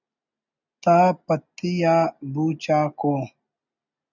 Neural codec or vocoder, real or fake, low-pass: none; real; 7.2 kHz